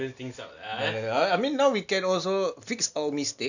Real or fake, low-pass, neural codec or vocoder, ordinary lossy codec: real; 7.2 kHz; none; none